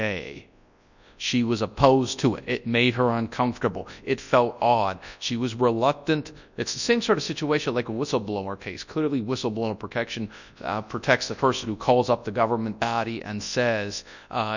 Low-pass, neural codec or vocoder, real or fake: 7.2 kHz; codec, 24 kHz, 0.9 kbps, WavTokenizer, large speech release; fake